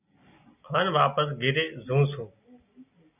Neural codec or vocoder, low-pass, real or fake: none; 3.6 kHz; real